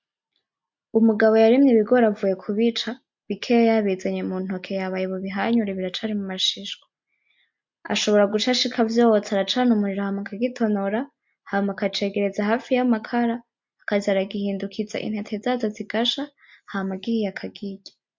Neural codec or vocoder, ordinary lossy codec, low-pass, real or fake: none; MP3, 48 kbps; 7.2 kHz; real